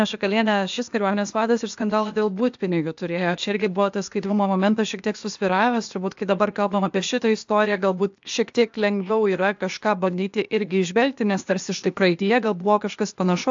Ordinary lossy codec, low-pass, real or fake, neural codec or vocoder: AAC, 64 kbps; 7.2 kHz; fake; codec, 16 kHz, 0.8 kbps, ZipCodec